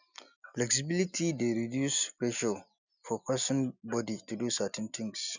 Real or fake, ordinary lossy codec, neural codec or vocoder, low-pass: real; none; none; 7.2 kHz